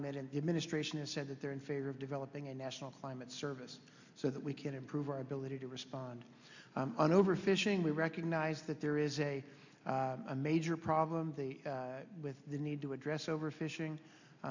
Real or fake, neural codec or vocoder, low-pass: real; none; 7.2 kHz